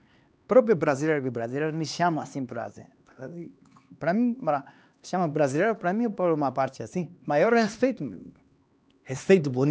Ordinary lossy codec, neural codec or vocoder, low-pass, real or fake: none; codec, 16 kHz, 2 kbps, X-Codec, HuBERT features, trained on LibriSpeech; none; fake